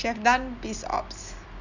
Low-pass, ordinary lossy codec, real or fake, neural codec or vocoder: 7.2 kHz; none; real; none